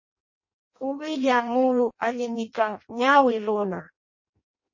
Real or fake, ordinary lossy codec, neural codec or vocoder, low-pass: fake; MP3, 32 kbps; codec, 16 kHz in and 24 kHz out, 0.6 kbps, FireRedTTS-2 codec; 7.2 kHz